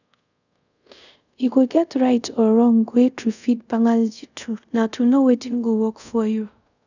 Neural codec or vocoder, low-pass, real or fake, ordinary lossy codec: codec, 24 kHz, 0.5 kbps, DualCodec; 7.2 kHz; fake; none